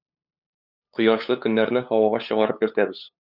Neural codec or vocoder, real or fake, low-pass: codec, 16 kHz, 8 kbps, FunCodec, trained on LibriTTS, 25 frames a second; fake; 5.4 kHz